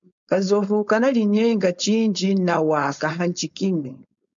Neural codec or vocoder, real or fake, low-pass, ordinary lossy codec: codec, 16 kHz, 4.8 kbps, FACodec; fake; 7.2 kHz; MP3, 64 kbps